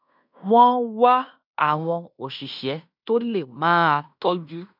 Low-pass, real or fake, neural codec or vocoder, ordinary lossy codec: 5.4 kHz; fake; codec, 16 kHz in and 24 kHz out, 0.9 kbps, LongCat-Audio-Codec, fine tuned four codebook decoder; none